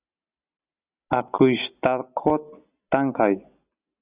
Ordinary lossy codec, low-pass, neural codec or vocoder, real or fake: Opus, 64 kbps; 3.6 kHz; none; real